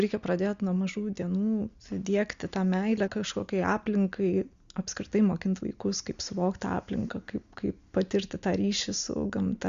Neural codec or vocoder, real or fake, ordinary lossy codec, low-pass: none; real; Opus, 64 kbps; 7.2 kHz